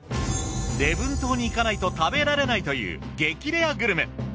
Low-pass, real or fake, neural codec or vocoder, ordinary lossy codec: none; real; none; none